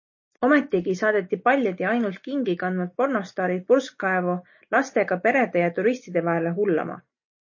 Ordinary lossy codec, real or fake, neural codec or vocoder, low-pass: MP3, 32 kbps; real; none; 7.2 kHz